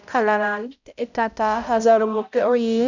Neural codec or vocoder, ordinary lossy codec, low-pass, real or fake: codec, 16 kHz, 0.5 kbps, X-Codec, HuBERT features, trained on balanced general audio; none; 7.2 kHz; fake